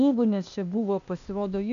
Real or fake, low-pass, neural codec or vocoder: fake; 7.2 kHz; codec, 16 kHz, 0.8 kbps, ZipCodec